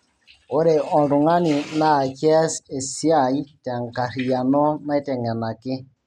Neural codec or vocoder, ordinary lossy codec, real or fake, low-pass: none; MP3, 96 kbps; real; 14.4 kHz